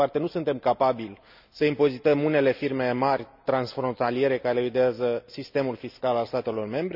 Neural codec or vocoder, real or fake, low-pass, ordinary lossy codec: none; real; 5.4 kHz; none